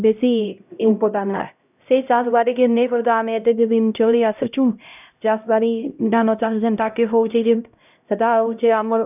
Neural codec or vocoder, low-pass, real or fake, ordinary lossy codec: codec, 16 kHz, 0.5 kbps, X-Codec, HuBERT features, trained on LibriSpeech; 3.6 kHz; fake; none